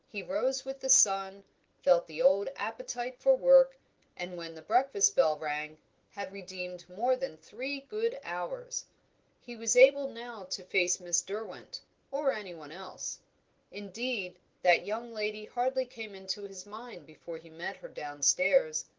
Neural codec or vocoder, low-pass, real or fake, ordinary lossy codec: none; 7.2 kHz; real; Opus, 16 kbps